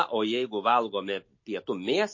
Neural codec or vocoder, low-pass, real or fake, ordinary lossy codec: none; 7.2 kHz; real; MP3, 32 kbps